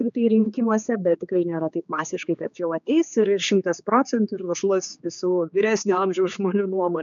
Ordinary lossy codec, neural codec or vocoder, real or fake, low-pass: MP3, 96 kbps; codec, 16 kHz, 4 kbps, X-Codec, HuBERT features, trained on general audio; fake; 7.2 kHz